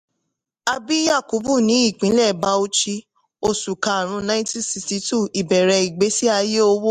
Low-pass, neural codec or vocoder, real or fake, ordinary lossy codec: 14.4 kHz; none; real; MP3, 64 kbps